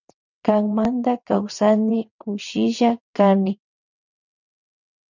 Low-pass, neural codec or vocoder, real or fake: 7.2 kHz; vocoder, 22.05 kHz, 80 mel bands, WaveNeXt; fake